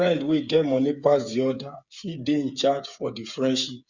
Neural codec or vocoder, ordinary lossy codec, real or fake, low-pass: codec, 16 kHz, 8 kbps, FreqCodec, smaller model; Opus, 64 kbps; fake; 7.2 kHz